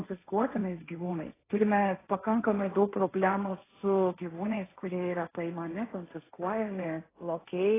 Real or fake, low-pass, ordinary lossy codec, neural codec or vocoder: fake; 3.6 kHz; AAC, 16 kbps; codec, 16 kHz, 1.1 kbps, Voila-Tokenizer